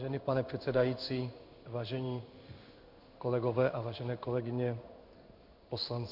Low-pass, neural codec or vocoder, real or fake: 5.4 kHz; codec, 16 kHz in and 24 kHz out, 1 kbps, XY-Tokenizer; fake